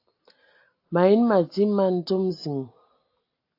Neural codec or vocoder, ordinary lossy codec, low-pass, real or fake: none; AAC, 32 kbps; 5.4 kHz; real